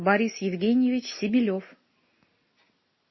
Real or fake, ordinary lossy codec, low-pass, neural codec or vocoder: real; MP3, 24 kbps; 7.2 kHz; none